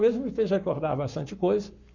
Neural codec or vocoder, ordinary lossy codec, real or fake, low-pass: codec, 44.1 kHz, 7.8 kbps, Pupu-Codec; none; fake; 7.2 kHz